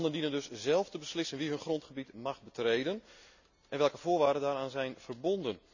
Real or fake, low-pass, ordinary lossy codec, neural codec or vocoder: real; 7.2 kHz; none; none